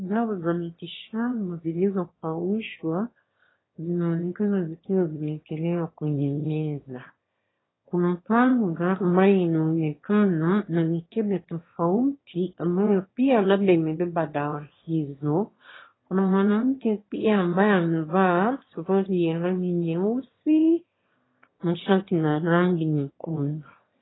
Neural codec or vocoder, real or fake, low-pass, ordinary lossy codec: autoencoder, 22.05 kHz, a latent of 192 numbers a frame, VITS, trained on one speaker; fake; 7.2 kHz; AAC, 16 kbps